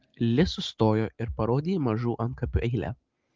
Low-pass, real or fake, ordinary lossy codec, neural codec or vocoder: 7.2 kHz; real; Opus, 32 kbps; none